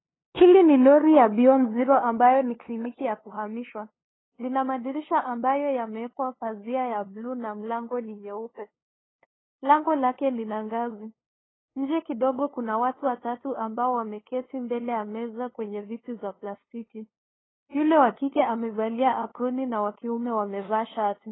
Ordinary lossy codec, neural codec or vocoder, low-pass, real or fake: AAC, 16 kbps; codec, 16 kHz, 2 kbps, FunCodec, trained on LibriTTS, 25 frames a second; 7.2 kHz; fake